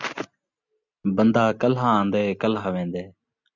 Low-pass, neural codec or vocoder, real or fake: 7.2 kHz; none; real